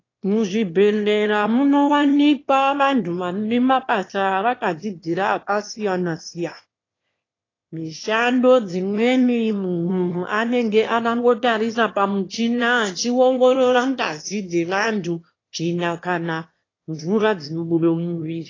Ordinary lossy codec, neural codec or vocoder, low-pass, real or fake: AAC, 32 kbps; autoencoder, 22.05 kHz, a latent of 192 numbers a frame, VITS, trained on one speaker; 7.2 kHz; fake